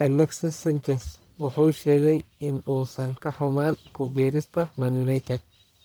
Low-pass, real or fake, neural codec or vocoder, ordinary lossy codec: none; fake; codec, 44.1 kHz, 1.7 kbps, Pupu-Codec; none